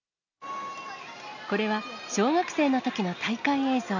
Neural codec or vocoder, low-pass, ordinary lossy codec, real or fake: none; 7.2 kHz; none; real